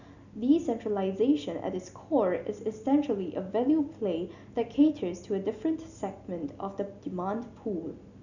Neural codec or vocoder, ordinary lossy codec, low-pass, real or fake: none; none; 7.2 kHz; real